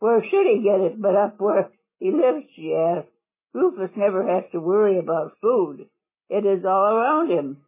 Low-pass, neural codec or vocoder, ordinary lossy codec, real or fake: 3.6 kHz; none; MP3, 16 kbps; real